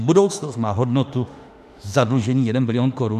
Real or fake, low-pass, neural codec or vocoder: fake; 14.4 kHz; autoencoder, 48 kHz, 32 numbers a frame, DAC-VAE, trained on Japanese speech